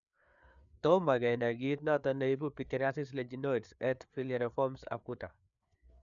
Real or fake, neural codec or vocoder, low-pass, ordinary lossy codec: fake; codec, 16 kHz, 4 kbps, FreqCodec, larger model; 7.2 kHz; none